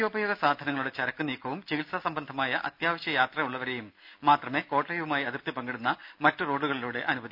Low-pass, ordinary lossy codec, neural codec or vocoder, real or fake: 5.4 kHz; none; none; real